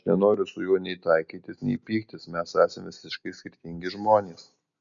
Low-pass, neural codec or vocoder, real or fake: 7.2 kHz; none; real